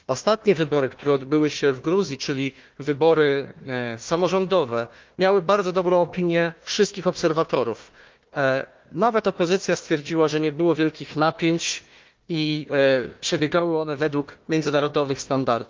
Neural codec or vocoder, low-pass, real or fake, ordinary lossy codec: codec, 16 kHz, 1 kbps, FunCodec, trained on Chinese and English, 50 frames a second; 7.2 kHz; fake; Opus, 32 kbps